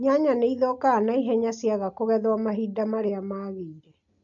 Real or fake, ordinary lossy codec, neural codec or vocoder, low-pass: real; AAC, 64 kbps; none; 7.2 kHz